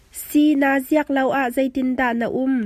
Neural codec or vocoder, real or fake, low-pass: none; real; 14.4 kHz